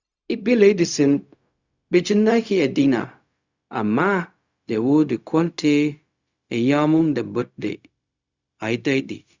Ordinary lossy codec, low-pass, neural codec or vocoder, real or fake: Opus, 64 kbps; 7.2 kHz; codec, 16 kHz, 0.4 kbps, LongCat-Audio-Codec; fake